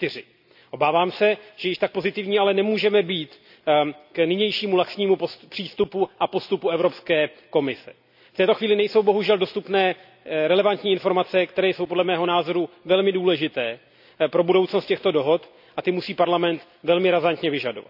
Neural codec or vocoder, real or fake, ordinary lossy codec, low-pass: none; real; none; 5.4 kHz